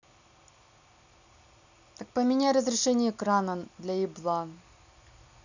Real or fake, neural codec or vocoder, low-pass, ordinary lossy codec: real; none; 7.2 kHz; none